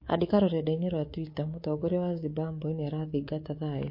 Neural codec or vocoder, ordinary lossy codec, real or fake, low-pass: codec, 24 kHz, 3.1 kbps, DualCodec; MP3, 32 kbps; fake; 5.4 kHz